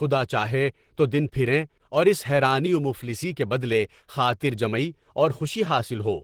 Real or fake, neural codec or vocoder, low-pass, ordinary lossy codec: fake; vocoder, 44.1 kHz, 128 mel bands, Pupu-Vocoder; 19.8 kHz; Opus, 16 kbps